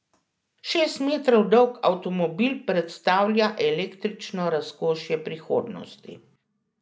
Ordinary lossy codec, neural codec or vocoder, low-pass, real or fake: none; none; none; real